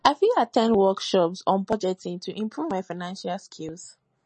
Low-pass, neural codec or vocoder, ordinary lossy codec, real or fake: 9.9 kHz; none; MP3, 32 kbps; real